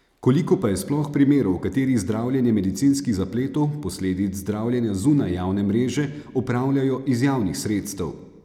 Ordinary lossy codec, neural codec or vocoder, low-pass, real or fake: none; none; 19.8 kHz; real